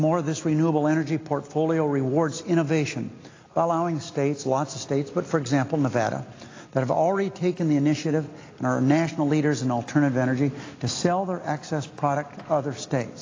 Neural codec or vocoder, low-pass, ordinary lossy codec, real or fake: none; 7.2 kHz; AAC, 32 kbps; real